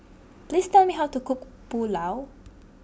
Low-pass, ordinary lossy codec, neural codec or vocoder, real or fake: none; none; none; real